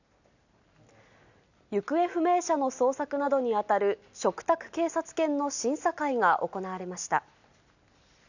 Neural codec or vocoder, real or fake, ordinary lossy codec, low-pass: none; real; none; 7.2 kHz